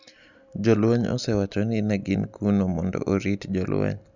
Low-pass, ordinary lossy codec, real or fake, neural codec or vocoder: 7.2 kHz; none; real; none